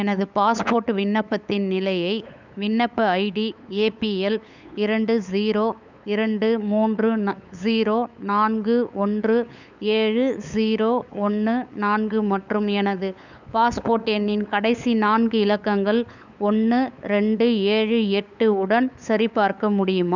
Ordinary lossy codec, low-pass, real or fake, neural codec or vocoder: none; 7.2 kHz; fake; codec, 16 kHz, 8 kbps, FunCodec, trained on LibriTTS, 25 frames a second